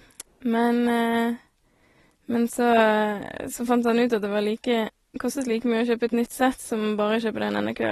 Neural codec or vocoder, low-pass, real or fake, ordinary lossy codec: none; 19.8 kHz; real; AAC, 32 kbps